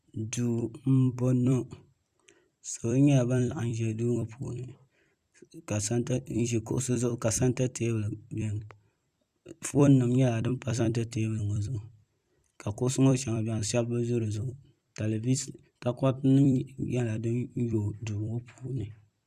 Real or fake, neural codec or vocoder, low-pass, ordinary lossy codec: fake; vocoder, 44.1 kHz, 128 mel bands every 256 samples, BigVGAN v2; 14.4 kHz; Opus, 64 kbps